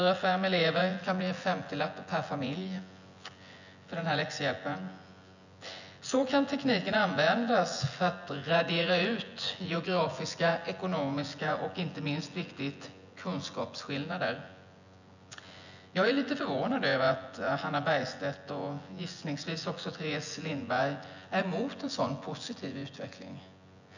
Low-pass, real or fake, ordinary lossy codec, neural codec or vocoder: 7.2 kHz; fake; none; vocoder, 24 kHz, 100 mel bands, Vocos